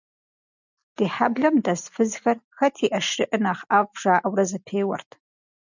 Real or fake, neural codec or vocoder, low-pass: real; none; 7.2 kHz